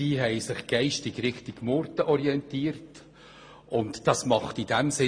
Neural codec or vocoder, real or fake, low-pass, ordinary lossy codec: none; real; 9.9 kHz; MP3, 48 kbps